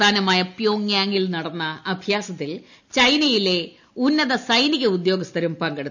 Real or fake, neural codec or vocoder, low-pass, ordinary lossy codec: real; none; 7.2 kHz; none